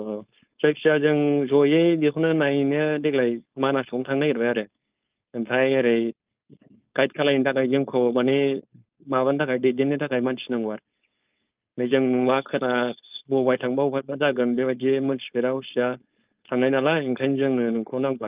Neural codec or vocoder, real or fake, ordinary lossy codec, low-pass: codec, 16 kHz, 4.8 kbps, FACodec; fake; Opus, 32 kbps; 3.6 kHz